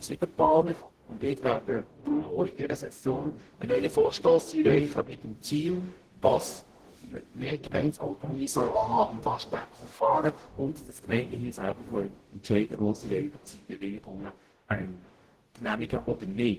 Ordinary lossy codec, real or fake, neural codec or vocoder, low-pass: Opus, 16 kbps; fake; codec, 44.1 kHz, 0.9 kbps, DAC; 14.4 kHz